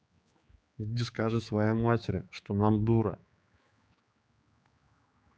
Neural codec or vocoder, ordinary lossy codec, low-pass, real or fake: codec, 16 kHz, 4 kbps, X-Codec, HuBERT features, trained on general audio; none; none; fake